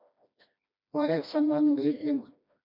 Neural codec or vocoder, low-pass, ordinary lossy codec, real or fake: codec, 16 kHz, 1 kbps, FreqCodec, smaller model; 5.4 kHz; MP3, 48 kbps; fake